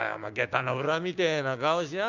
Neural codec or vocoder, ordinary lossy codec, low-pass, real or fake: codec, 16 kHz, 6 kbps, DAC; none; 7.2 kHz; fake